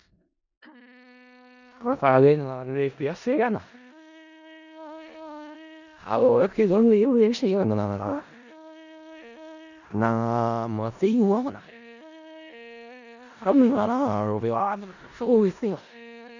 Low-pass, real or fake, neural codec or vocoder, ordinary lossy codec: 7.2 kHz; fake; codec, 16 kHz in and 24 kHz out, 0.4 kbps, LongCat-Audio-Codec, four codebook decoder; none